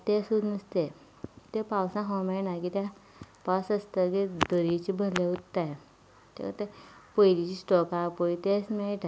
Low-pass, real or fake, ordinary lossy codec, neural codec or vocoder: none; real; none; none